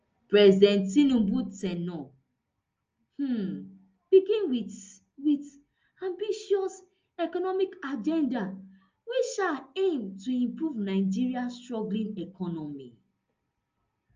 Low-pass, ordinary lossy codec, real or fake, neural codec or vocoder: 7.2 kHz; Opus, 24 kbps; real; none